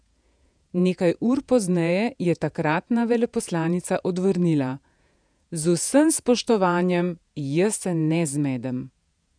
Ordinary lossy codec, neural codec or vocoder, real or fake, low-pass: none; vocoder, 48 kHz, 128 mel bands, Vocos; fake; 9.9 kHz